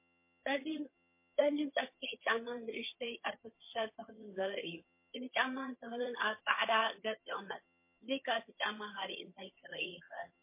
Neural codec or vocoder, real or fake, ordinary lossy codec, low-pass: vocoder, 22.05 kHz, 80 mel bands, HiFi-GAN; fake; MP3, 24 kbps; 3.6 kHz